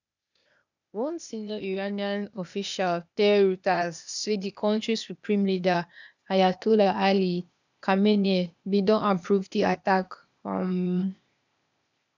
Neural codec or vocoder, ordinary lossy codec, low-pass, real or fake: codec, 16 kHz, 0.8 kbps, ZipCodec; none; 7.2 kHz; fake